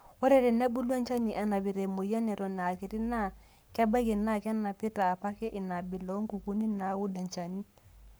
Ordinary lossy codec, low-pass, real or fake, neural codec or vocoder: none; none; fake; codec, 44.1 kHz, 7.8 kbps, Pupu-Codec